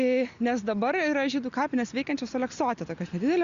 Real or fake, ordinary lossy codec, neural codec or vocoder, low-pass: real; Opus, 64 kbps; none; 7.2 kHz